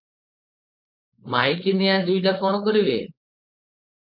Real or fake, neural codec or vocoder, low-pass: fake; codec, 16 kHz, 4.8 kbps, FACodec; 5.4 kHz